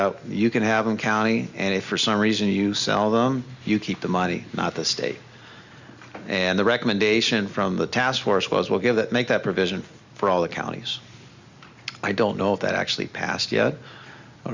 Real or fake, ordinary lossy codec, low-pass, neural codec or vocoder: real; Opus, 64 kbps; 7.2 kHz; none